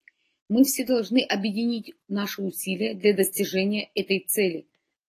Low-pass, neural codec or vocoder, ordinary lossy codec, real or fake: 14.4 kHz; none; AAC, 48 kbps; real